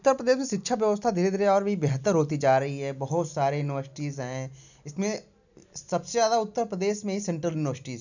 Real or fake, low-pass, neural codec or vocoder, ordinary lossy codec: real; 7.2 kHz; none; none